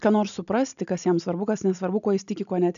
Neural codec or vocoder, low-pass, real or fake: none; 7.2 kHz; real